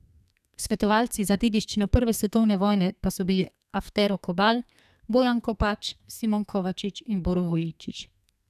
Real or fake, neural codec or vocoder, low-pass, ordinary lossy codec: fake; codec, 32 kHz, 1.9 kbps, SNAC; 14.4 kHz; none